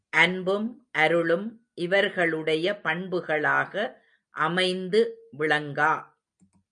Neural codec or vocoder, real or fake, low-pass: none; real; 9.9 kHz